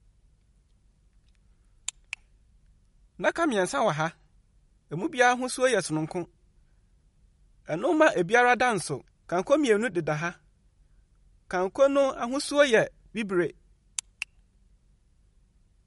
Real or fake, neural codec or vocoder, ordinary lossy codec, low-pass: real; none; MP3, 48 kbps; 19.8 kHz